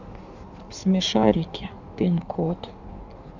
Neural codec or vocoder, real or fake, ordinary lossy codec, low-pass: codec, 16 kHz in and 24 kHz out, 1.1 kbps, FireRedTTS-2 codec; fake; none; 7.2 kHz